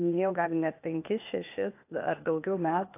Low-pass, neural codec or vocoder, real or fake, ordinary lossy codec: 3.6 kHz; codec, 16 kHz, 0.8 kbps, ZipCodec; fake; AAC, 24 kbps